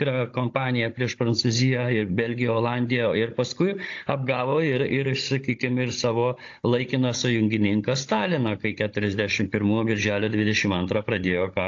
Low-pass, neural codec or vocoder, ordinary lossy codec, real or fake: 7.2 kHz; codec, 16 kHz, 16 kbps, FunCodec, trained on Chinese and English, 50 frames a second; AAC, 48 kbps; fake